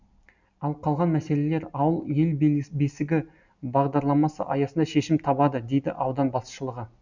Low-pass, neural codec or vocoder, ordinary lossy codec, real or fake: 7.2 kHz; none; none; real